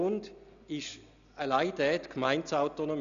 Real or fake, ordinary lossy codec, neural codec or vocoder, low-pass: real; none; none; 7.2 kHz